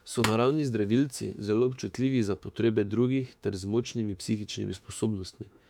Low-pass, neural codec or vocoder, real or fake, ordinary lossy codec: 19.8 kHz; autoencoder, 48 kHz, 32 numbers a frame, DAC-VAE, trained on Japanese speech; fake; none